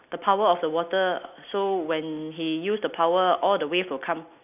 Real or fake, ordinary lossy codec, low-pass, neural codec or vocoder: real; none; 3.6 kHz; none